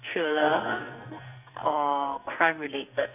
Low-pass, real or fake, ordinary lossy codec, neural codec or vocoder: 3.6 kHz; fake; none; codec, 44.1 kHz, 2.6 kbps, SNAC